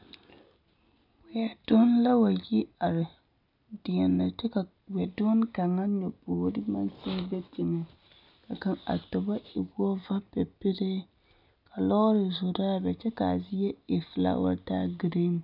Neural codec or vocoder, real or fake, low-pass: none; real; 5.4 kHz